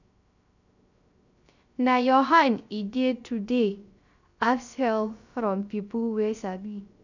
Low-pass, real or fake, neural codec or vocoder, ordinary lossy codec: 7.2 kHz; fake; codec, 16 kHz, 0.3 kbps, FocalCodec; none